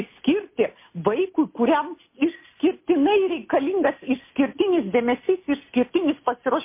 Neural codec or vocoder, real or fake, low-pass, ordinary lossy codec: none; real; 3.6 kHz; MP3, 24 kbps